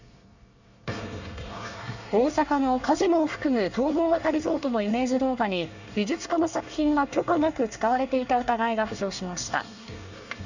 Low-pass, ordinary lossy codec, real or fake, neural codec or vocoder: 7.2 kHz; none; fake; codec, 24 kHz, 1 kbps, SNAC